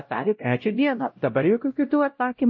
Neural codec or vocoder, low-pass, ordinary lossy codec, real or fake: codec, 16 kHz, 0.5 kbps, X-Codec, WavLM features, trained on Multilingual LibriSpeech; 7.2 kHz; MP3, 32 kbps; fake